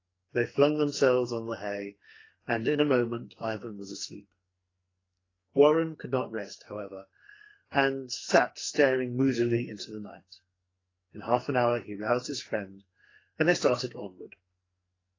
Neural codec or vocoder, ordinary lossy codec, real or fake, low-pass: codec, 44.1 kHz, 2.6 kbps, SNAC; AAC, 32 kbps; fake; 7.2 kHz